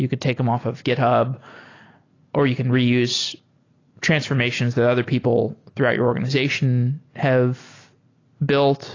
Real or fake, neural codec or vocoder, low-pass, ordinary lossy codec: real; none; 7.2 kHz; AAC, 32 kbps